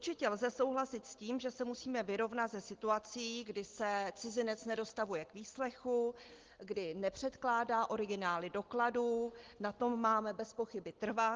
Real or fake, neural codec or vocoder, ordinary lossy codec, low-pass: real; none; Opus, 16 kbps; 7.2 kHz